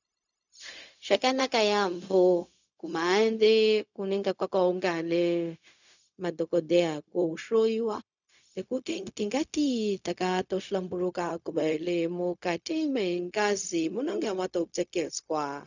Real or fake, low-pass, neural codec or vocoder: fake; 7.2 kHz; codec, 16 kHz, 0.4 kbps, LongCat-Audio-Codec